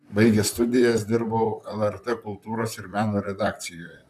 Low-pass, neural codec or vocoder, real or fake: 14.4 kHz; vocoder, 44.1 kHz, 128 mel bands, Pupu-Vocoder; fake